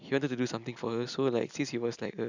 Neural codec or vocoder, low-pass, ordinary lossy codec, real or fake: none; 7.2 kHz; none; real